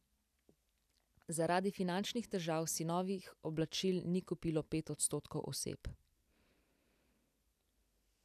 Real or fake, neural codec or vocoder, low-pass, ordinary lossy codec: real; none; 14.4 kHz; none